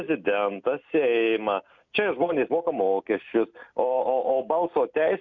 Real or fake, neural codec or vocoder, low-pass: real; none; 7.2 kHz